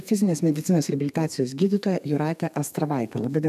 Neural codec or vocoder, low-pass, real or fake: codec, 32 kHz, 1.9 kbps, SNAC; 14.4 kHz; fake